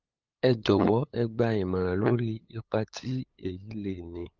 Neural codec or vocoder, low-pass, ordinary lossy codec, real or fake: codec, 16 kHz, 8 kbps, FunCodec, trained on LibriTTS, 25 frames a second; 7.2 kHz; Opus, 32 kbps; fake